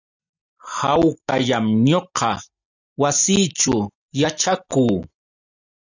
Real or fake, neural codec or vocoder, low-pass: real; none; 7.2 kHz